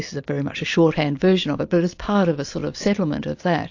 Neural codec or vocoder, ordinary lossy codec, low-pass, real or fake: none; AAC, 48 kbps; 7.2 kHz; real